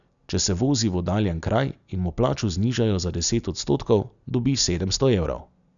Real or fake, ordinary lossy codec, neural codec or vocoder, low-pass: real; none; none; 7.2 kHz